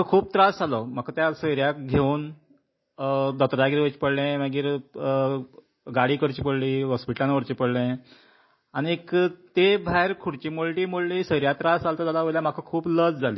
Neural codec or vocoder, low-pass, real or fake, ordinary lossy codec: none; 7.2 kHz; real; MP3, 24 kbps